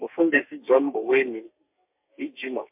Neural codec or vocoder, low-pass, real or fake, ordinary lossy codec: codec, 32 kHz, 1.9 kbps, SNAC; 3.6 kHz; fake; MP3, 32 kbps